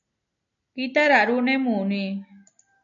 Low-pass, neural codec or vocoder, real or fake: 7.2 kHz; none; real